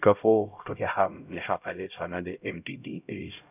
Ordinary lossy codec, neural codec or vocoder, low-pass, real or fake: none; codec, 16 kHz, 0.5 kbps, X-Codec, HuBERT features, trained on LibriSpeech; 3.6 kHz; fake